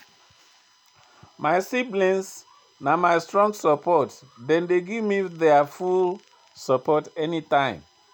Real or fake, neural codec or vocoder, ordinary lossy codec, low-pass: real; none; none; none